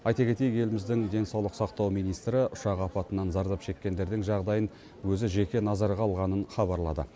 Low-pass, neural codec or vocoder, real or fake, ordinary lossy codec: none; none; real; none